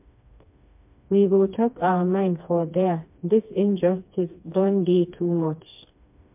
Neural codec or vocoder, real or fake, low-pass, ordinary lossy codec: codec, 16 kHz, 2 kbps, FreqCodec, smaller model; fake; 3.6 kHz; MP3, 32 kbps